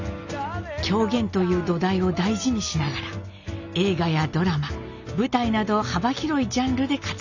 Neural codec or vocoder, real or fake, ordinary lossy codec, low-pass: none; real; none; 7.2 kHz